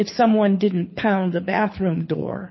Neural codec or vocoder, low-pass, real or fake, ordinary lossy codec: codec, 24 kHz, 6 kbps, HILCodec; 7.2 kHz; fake; MP3, 24 kbps